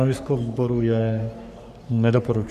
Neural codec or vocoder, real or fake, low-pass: codec, 44.1 kHz, 3.4 kbps, Pupu-Codec; fake; 14.4 kHz